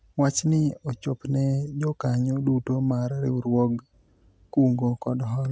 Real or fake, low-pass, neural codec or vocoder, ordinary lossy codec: real; none; none; none